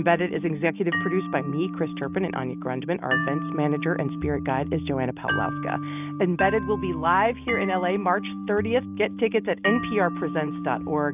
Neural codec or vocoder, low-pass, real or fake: none; 3.6 kHz; real